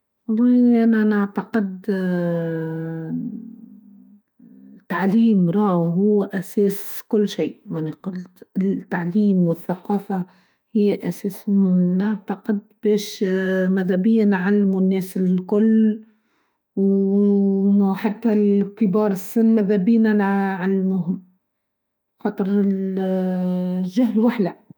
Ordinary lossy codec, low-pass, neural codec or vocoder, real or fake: none; none; autoencoder, 48 kHz, 32 numbers a frame, DAC-VAE, trained on Japanese speech; fake